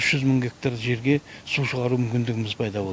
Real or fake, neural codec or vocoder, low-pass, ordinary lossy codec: real; none; none; none